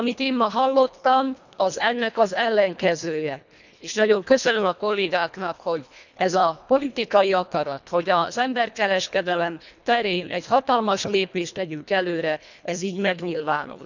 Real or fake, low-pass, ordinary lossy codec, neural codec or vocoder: fake; 7.2 kHz; none; codec, 24 kHz, 1.5 kbps, HILCodec